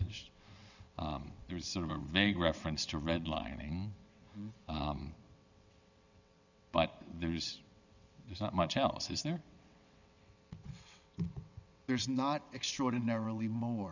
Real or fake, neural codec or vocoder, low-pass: fake; vocoder, 22.05 kHz, 80 mel bands, WaveNeXt; 7.2 kHz